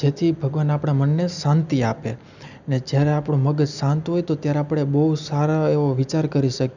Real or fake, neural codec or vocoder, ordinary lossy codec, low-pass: real; none; none; 7.2 kHz